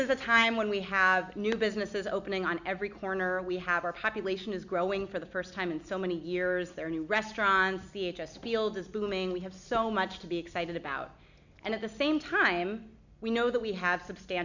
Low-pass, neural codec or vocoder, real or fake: 7.2 kHz; none; real